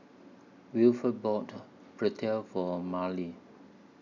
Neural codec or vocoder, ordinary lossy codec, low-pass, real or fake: none; none; 7.2 kHz; real